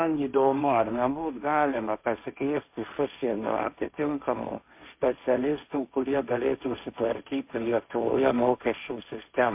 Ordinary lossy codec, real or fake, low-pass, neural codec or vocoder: MP3, 32 kbps; fake; 3.6 kHz; codec, 16 kHz, 1.1 kbps, Voila-Tokenizer